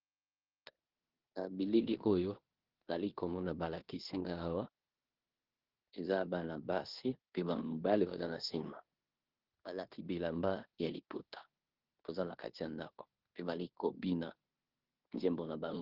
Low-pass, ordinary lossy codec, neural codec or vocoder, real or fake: 5.4 kHz; Opus, 16 kbps; codec, 16 kHz in and 24 kHz out, 0.9 kbps, LongCat-Audio-Codec, four codebook decoder; fake